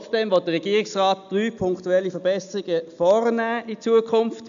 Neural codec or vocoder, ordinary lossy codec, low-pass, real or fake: none; AAC, 96 kbps; 7.2 kHz; real